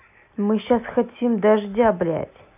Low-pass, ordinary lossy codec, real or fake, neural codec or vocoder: 3.6 kHz; AAC, 32 kbps; real; none